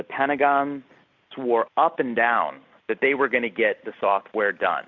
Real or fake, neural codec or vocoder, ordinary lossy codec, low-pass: real; none; MP3, 64 kbps; 7.2 kHz